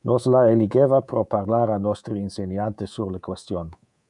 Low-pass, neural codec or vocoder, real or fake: 10.8 kHz; codec, 24 kHz, 3.1 kbps, DualCodec; fake